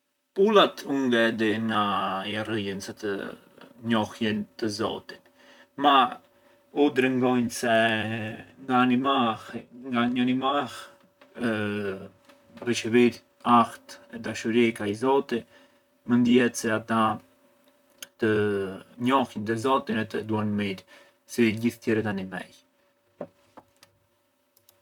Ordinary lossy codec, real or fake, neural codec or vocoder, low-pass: none; fake; vocoder, 44.1 kHz, 128 mel bands, Pupu-Vocoder; 19.8 kHz